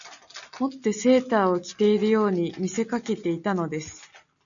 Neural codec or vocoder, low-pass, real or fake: none; 7.2 kHz; real